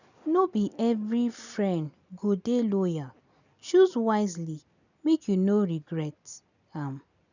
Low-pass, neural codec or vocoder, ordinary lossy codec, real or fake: 7.2 kHz; none; none; real